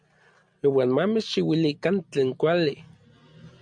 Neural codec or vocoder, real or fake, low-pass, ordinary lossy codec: none; real; 9.9 kHz; MP3, 64 kbps